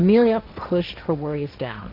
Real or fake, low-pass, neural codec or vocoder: fake; 5.4 kHz; codec, 16 kHz, 1.1 kbps, Voila-Tokenizer